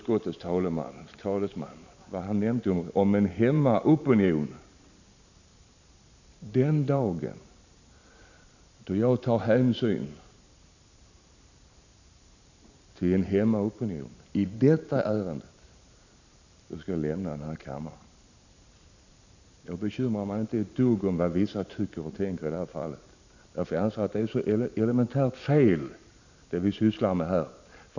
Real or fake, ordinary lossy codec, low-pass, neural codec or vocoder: fake; none; 7.2 kHz; vocoder, 44.1 kHz, 128 mel bands every 512 samples, BigVGAN v2